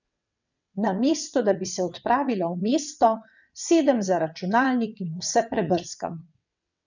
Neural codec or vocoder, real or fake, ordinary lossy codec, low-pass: vocoder, 22.05 kHz, 80 mel bands, WaveNeXt; fake; none; 7.2 kHz